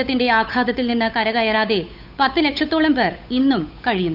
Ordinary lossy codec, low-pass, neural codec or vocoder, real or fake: none; 5.4 kHz; codec, 16 kHz, 8 kbps, FunCodec, trained on Chinese and English, 25 frames a second; fake